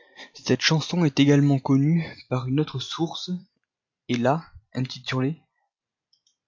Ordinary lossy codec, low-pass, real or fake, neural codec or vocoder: MP3, 48 kbps; 7.2 kHz; real; none